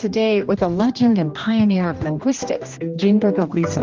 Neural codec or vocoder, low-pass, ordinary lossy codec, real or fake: codec, 16 kHz, 1 kbps, X-Codec, HuBERT features, trained on general audio; 7.2 kHz; Opus, 32 kbps; fake